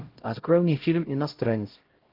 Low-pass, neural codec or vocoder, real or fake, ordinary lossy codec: 5.4 kHz; codec, 16 kHz, 0.5 kbps, X-Codec, HuBERT features, trained on LibriSpeech; fake; Opus, 16 kbps